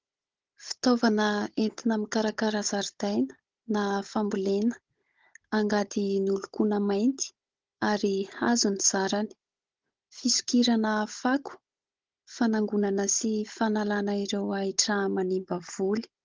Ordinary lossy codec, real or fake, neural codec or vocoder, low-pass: Opus, 16 kbps; fake; codec, 16 kHz, 16 kbps, FunCodec, trained on Chinese and English, 50 frames a second; 7.2 kHz